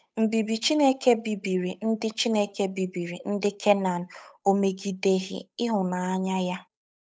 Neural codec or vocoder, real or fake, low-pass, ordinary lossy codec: codec, 16 kHz, 8 kbps, FunCodec, trained on Chinese and English, 25 frames a second; fake; none; none